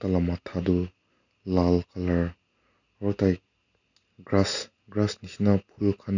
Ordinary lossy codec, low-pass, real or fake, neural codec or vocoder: none; 7.2 kHz; real; none